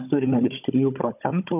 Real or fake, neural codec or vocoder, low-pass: fake; codec, 16 kHz, 16 kbps, FunCodec, trained on Chinese and English, 50 frames a second; 3.6 kHz